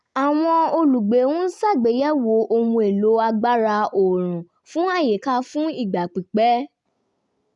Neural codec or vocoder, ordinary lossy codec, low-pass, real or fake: none; none; 10.8 kHz; real